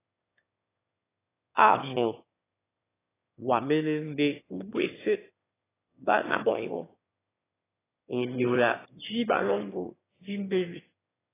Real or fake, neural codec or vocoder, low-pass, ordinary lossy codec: fake; autoencoder, 22.05 kHz, a latent of 192 numbers a frame, VITS, trained on one speaker; 3.6 kHz; AAC, 16 kbps